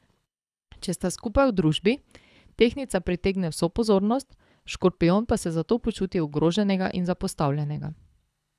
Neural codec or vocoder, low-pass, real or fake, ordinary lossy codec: codec, 24 kHz, 6 kbps, HILCodec; none; fake; none